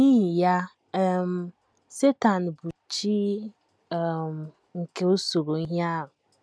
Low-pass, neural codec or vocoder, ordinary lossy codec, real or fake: none; none; none; real